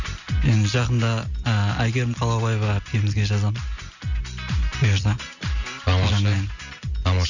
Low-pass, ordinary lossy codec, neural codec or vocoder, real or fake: 7.2 kHz; none; none; real